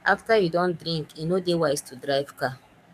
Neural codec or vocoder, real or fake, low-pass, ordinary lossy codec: codec, 44.1 kHz, 7.8 kbps, DAC; fake; 14.4 kHz; none